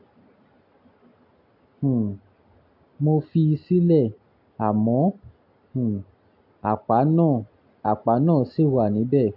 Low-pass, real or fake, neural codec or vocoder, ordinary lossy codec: 5.4 kHz; real; none; AAC, 48 kbps